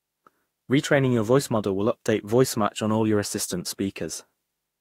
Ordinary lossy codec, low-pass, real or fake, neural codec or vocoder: AAC, 48 kbps; 19.8 kHz; fake; autoencoder, 48 kHz, 32 numbers a frame, DAC-VAE, trained on Japanese speech